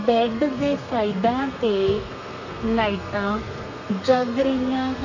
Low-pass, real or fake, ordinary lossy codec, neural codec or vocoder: 7.2 kHz; fake; none; codec, 32 kHz, 1.9 kbps, SNAC